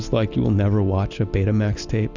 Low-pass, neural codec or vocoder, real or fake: 7.2 kHz; none; real